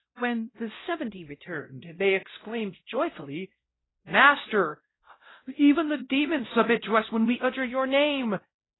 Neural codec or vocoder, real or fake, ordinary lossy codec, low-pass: codec, 16 kHz, 0.5 kbps, X-Codec, WavLM features, trained on Multilingual LibriSpeech; fake; AAC, 16 kbps; 7.2 kHz